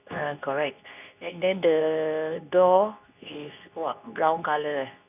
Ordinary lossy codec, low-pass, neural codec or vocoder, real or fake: none; 3.6 kHz; codec, 24 kHz, 0.9 kbps, WavTokenizer, medium speech release version 2; fake